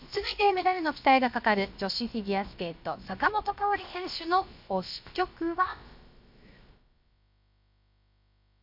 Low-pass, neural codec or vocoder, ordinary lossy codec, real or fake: 5.4 kHz; codec, 16 kHz, about 1 kbps, DyCAST, with the encoder's durations; MP3, 48 kbps; fake